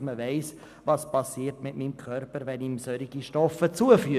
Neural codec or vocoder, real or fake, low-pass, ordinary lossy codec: none; real; 14.4 kHz; none